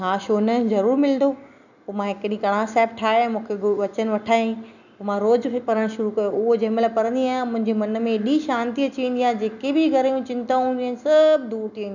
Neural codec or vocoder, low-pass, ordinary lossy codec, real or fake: none; 7.2 kHz; none; real